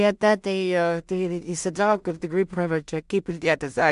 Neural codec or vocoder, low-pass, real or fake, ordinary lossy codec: codec, 16 kHz in and 24 kHz out, 0.4 kbps, LongCat-Audio-Codec, two codebook decoder; 10.8 kHz; fake; MP3, 64 kbps